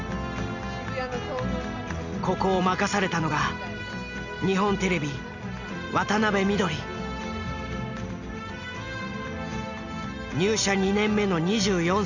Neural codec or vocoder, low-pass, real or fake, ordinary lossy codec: none; 7.2 kHz; real; none